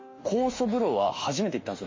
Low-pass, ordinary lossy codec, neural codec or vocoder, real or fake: 7.2 kHz; MP3, 32 kbps; autoencoder, 48 kHz, 32 numbers a frame, DAC-VAE, trained on Japanese speech; fake